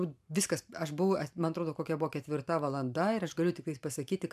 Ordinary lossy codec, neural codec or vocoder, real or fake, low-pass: MP3, 96 kbps; vocoder, 44.1 kHz, 128 mel bands every 512 samples, BigVGAN v2; fake; 14.4 kHz